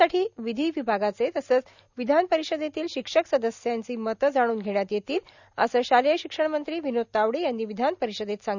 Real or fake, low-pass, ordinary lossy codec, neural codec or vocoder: real; 7.2 kHz; none; none